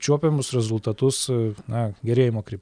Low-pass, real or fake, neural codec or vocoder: 9.9 kHz; real; none